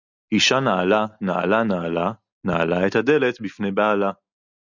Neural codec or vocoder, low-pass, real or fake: none; 7.2 kHz; real